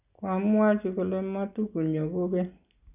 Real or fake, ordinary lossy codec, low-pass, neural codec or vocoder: real; none; 3.6 kHz; none